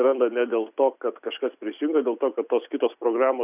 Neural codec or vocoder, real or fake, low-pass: none; real; 3.6 kHz